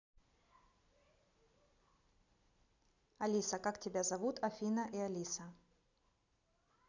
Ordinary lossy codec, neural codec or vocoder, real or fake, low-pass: none; none; real; 7.2 kHz